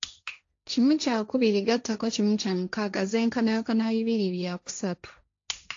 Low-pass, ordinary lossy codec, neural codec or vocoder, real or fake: 7.2 kHz; AAC, 48 kbps; codec, 16 kHz, 1.1 kbps, Voila-Tokenizer; fake